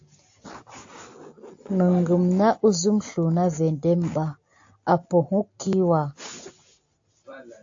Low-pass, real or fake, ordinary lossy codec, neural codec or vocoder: 7.2 kHz; real; AAC, 48 kbps; none